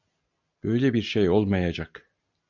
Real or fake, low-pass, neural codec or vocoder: real; 7.2 kHz; none